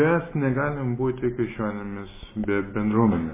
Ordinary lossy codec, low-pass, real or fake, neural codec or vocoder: MP3, 16 kbps; 3.6 kHz; real; none